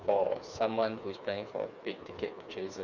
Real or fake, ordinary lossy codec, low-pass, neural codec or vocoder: fake; none; 7.2 kHz; codec, 16 kHz, 4 kbps, FreqCodec, smaller model